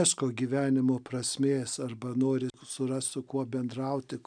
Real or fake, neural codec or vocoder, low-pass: real; none; 9.9 kHz